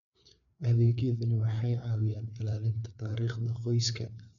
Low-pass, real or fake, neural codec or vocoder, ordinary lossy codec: 7.2 kHz; fake; codec, 16 kHz, 4 kbps, FreqCodec, larger model; none